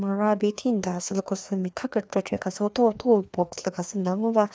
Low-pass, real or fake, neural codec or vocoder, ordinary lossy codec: none; fake; codec, 16 kHz, 2 kbps, FreqCodec, larger model; none